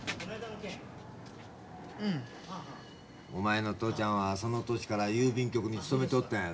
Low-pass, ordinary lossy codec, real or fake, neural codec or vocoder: none; none; real; none